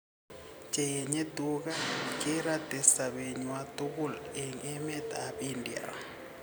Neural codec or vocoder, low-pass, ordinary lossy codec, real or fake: none; none; none; real